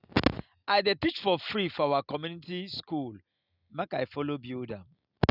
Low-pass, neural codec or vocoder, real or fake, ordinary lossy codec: 5.4 kHz; none; real; none